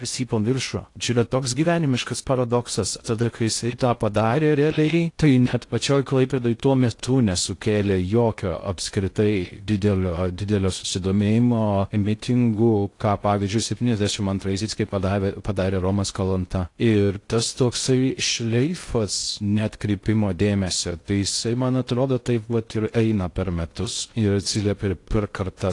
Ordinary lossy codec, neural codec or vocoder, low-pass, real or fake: AAC, 48 kbps; codec, 16 kHz in and 24 kHz out, 0.6 kbps, FocalCodec, streaming, 2048 codes; 10.8 kHz; fake